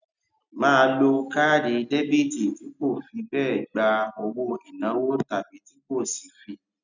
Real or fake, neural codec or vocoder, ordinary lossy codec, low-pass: real; none; none; 7.2 kHz